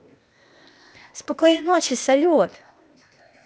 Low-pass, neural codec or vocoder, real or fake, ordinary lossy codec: none; codec, 16 kHz, 0.8 kbps, ZipCodec; fake; none